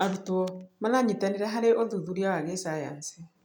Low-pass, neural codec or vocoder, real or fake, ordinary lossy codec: 19.8 kHz; none; real; none